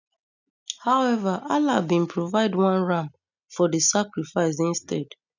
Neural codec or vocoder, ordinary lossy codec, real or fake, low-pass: none; none; real; 7.2 kHz